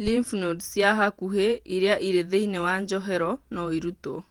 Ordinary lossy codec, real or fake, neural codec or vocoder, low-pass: Opus, 24 kbps; fake; vocoder, 48 kHz, 128 mel bands, Vocos; 19.8 kHz